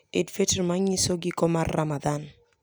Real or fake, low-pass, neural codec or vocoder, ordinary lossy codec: real; none; none; none